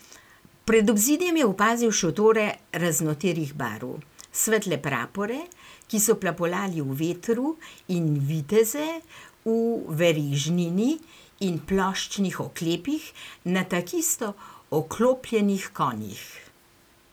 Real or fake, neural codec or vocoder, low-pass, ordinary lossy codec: real; none; none; none